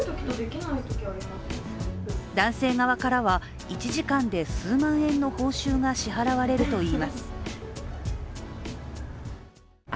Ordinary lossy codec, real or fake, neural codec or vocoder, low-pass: none; real; none; none